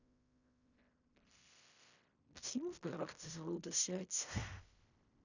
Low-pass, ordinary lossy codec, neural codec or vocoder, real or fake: 7.2 kHz; none; codec, 16 kHz in and 24 kHz out, 0.4 kbps, LongCat-Audio-Codec, fine tuned four codebook decoder; fake